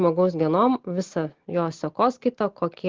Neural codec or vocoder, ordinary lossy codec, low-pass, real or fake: none; Opus, 16 kbps; 7.2 kHz; real